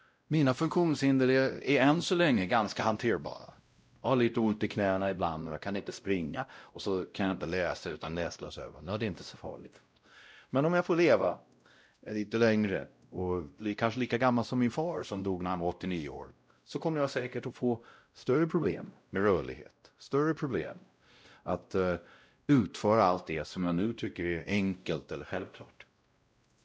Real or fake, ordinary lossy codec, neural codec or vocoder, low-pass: fake; none; codec, 16 kHz, 0.5 kbps, X-Codec, WavLM features, trained on Multilingual LibriSpeech; none